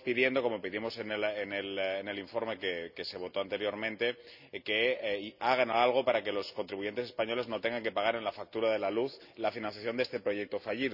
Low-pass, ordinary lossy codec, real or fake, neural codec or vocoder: 5.4 kHz; none; real; none